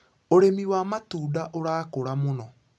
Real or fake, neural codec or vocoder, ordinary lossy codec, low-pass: real; none; none; none